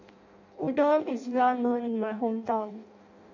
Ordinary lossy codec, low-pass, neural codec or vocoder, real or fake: none; 7.2 kHz; codec, 16 kHz in and 24 kHz out, 0.6 kbps, FireRedTTS-2 codec; fake